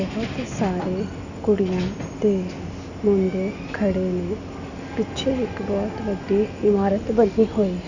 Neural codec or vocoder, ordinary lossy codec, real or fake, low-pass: none; none; real; 7.2 kHz